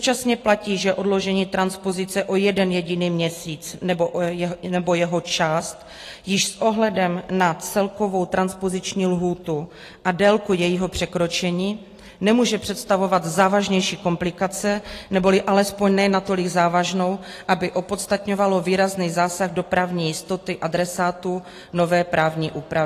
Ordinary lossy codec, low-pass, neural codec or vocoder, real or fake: AAC, 48 kbps; 14.4 kHz; none; real